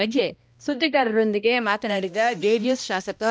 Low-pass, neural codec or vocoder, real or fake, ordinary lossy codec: none; codec, 16 kHz, 1 kbps, X-Codec, HuBERT features, trained on balanced general audio; fake; none